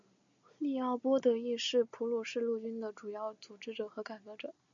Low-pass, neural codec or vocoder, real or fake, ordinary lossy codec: 7.2 kHz; none; real; MP3, 64 kbps